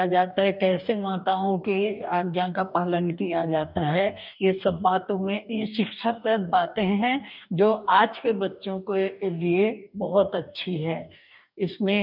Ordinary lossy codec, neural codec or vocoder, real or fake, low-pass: none; codec, 44.1 kHz, 2.6 kbps, DAC; fake; 5.4 kHz